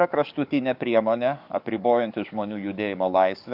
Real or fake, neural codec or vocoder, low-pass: fake; codec, 44.1 kHz, 7.8 kbps, DAC; 5.4 kHz